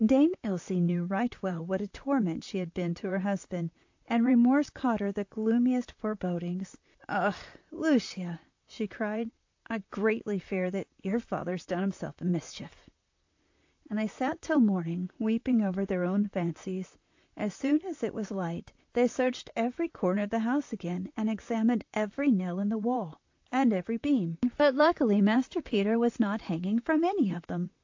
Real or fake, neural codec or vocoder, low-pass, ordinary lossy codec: fake; vocoder, 44.1 kHz, 128 mel bands, Pupu-Vocoder; 7.2 kHz; AAC, 48 kbps